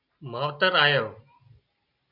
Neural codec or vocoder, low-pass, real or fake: none; 5.4 kHz; real